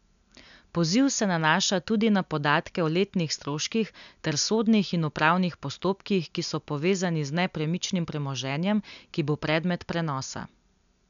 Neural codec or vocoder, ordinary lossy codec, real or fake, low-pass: none; none; real; 7.2 kHz